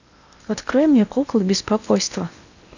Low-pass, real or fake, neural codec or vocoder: 7.2 kHz; fake; codec, 16 kHz in and 24 kHz out, 0.8 kbps, FocalCodec, streaming, 65536 codes